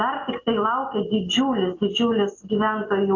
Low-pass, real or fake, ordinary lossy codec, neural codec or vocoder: 7.2 kHz; real; AAC, 48 kbps; none